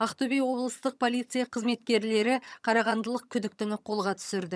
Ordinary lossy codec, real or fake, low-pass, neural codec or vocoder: none; fake; none; vocoder, 22.05 kHz, 80 mel bands, HiFi-GAN